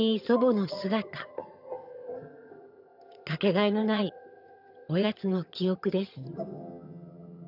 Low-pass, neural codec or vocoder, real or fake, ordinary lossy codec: 5.4 kHz; vocoder, 22.05 kHz, 80 mel bands, HiFi-GAN; fake; none